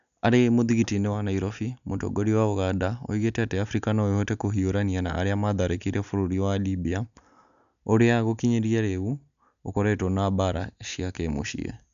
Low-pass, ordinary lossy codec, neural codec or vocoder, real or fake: 7.2 kHz; none; none; real